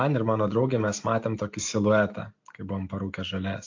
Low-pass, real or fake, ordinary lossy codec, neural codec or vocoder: 7.2 kHz; real; AAC, 48 kbps; none